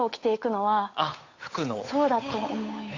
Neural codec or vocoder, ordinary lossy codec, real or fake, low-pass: codec, 16 kHz, 8 kbps, FunCodec, trained on Chinese and English, 25 frames a second; AAC, 32 kbps; fake; 7.2 kHz